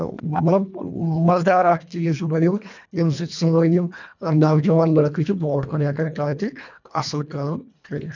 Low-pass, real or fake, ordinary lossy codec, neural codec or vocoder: 7.2 kHz; fake; none; codec, 24 kHz, 1.5 kbps, HILCodec